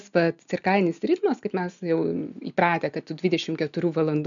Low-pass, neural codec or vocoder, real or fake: 7.2 kHz; none; real